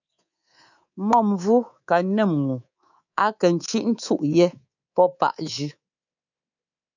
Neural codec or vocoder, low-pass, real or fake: codec, 24 kHz, 3.1 kbps, DualCodec; 7.2 kHz; fake